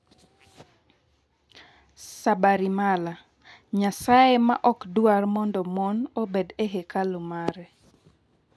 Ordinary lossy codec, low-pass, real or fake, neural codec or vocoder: none; none; real; none